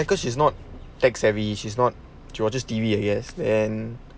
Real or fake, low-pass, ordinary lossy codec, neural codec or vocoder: real; none; none; none